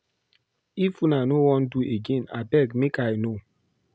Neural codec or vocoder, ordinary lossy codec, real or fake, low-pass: none; none; real; none